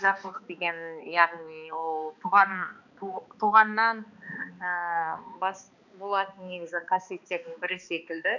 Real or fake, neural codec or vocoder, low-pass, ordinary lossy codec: fake; codec, 16 kHz, 2 kbps, X-Codec, HuBERT features, trained on balanced general audio; 7.2 kHz; none